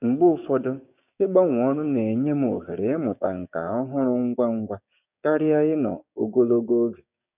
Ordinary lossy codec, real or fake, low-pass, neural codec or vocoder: none; fake; 3.6 kHz; codec, 16 kHz, 6 kbps, DAC